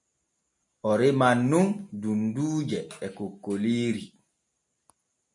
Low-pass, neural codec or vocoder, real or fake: 10.8 kHz; none; real